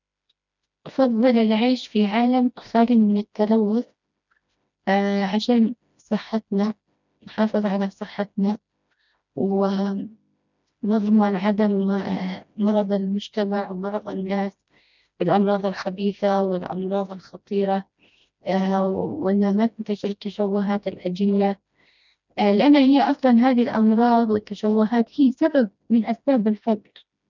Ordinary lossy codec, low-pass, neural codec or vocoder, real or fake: none; 7.2 kHz; codec, 16 kHz, 1 kbps, FreqCodec, smaller model; fake